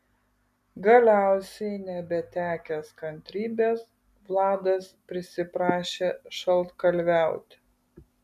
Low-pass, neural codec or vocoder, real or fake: 14.4 kHz; none; real